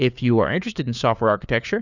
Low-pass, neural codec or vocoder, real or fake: 7.2 kHz; codec, 16 kHz, 4 kbps, FunCodec, trained on LibriTTS, 50 frames a second; fake